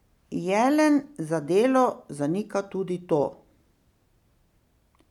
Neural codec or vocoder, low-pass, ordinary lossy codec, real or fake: none; 19.8 kHz; none; real